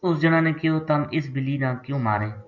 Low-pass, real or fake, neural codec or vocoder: 7.2 kHz; real; none